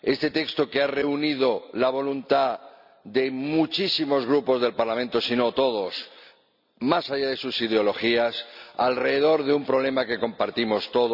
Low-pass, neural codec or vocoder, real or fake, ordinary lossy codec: 5.4 kHz; none; real; none